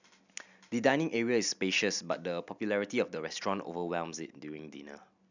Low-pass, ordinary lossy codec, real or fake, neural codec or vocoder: 7.2 kHz; none; real; none